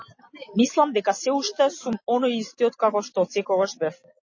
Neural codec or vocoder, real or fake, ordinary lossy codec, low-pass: none; real; MP3, 32 kbps; 7.2 kHz